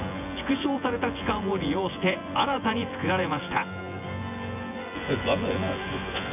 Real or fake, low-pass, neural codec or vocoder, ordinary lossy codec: fake; 3.6 kHz; vocoder, 24 kHz, 100 mel bands, Vocos; none